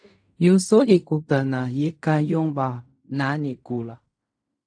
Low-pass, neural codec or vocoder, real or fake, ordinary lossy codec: 9.9 kHz; codec, 16 kHz in and 24 kHz out, 0.4 kbps, LongCat-Audio-Codec, fine tuned four codebook decoder; fake; AAC, 64 kbps